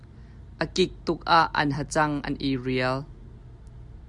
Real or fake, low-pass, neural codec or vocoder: real; 10.8 kHz; none